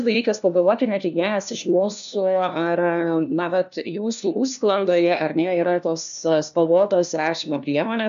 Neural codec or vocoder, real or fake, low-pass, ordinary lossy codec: codec, 16 kHz, 1 kbps, FunCodec, trained on LibriTTS, 50 frames a second; fake; 7.2 kHz; MP3, 96 kbps